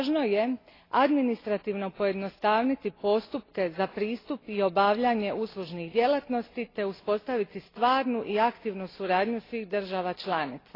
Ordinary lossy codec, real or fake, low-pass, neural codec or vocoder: AAC, 24 kbps; real; 5.4 kHz; none